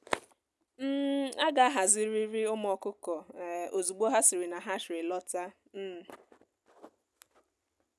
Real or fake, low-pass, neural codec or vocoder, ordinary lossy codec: real; none; none; none